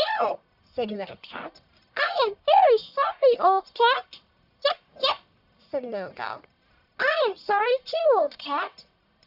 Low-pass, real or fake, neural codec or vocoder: 5.4 kHz; fake; codec, 44.1 kHz, 1.7 kbps, Pupu-Codec